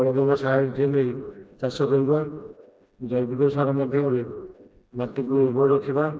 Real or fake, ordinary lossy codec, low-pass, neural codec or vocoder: fake; none; none; codec, 16 kHz, 1 kbps, FreqCodec, smaller model